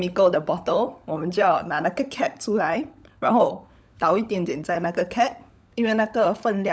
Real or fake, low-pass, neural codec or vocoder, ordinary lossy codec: fake; none; codec, 16 kHz, 16 kbps, FunCodec, trained on LibriTTS, 50 frames a second; none